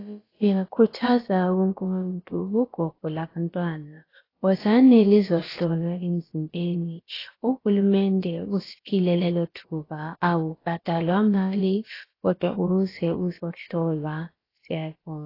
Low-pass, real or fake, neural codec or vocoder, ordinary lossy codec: 5.4 kHz; fake; codec, 16 kHz, about 1 kbps, DyCAST, with the encoder's durations; AAC, 24 kbps